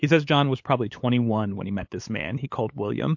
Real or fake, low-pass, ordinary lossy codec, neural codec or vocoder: fake; 7.2 kHz; MP3, 48 kbps; codec, 16 kHz, 8 kbps, FunCodec, trained on LibriTTS, 25 frames a second